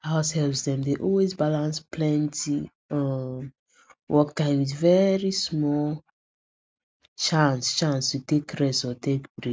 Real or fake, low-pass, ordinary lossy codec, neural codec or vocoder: real; none; none; none